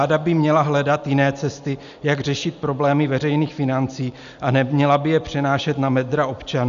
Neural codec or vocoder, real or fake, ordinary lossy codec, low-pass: none; real; AAC, 96 kbps; 7.2 kHz